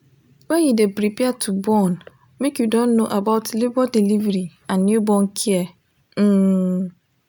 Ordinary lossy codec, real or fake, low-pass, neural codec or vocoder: none; real; none; none